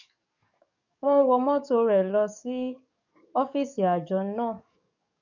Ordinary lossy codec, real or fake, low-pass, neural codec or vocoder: none; fake; 7.2 kHz; codec, 44.1 kHz, 7.8 kbps, DAC